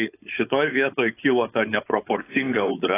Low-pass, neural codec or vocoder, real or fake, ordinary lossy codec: 3.6 kHz; codec, 16 kHz, 4.8 kbps, FACodec; fake; AAC, 16 kbps